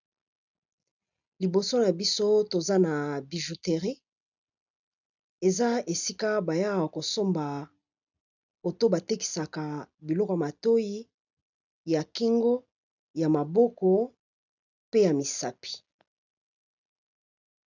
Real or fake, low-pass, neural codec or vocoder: real; 7.2 kHz; none